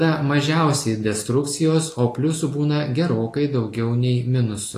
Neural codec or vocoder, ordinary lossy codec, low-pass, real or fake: none; AAC, 48 kbps; 14.4 kHz; real